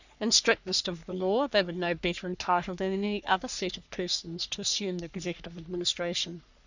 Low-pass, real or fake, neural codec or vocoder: 7.2 kHz; fake; codec, 44.1 kHz, 3.4 kbps, Pupu-Codec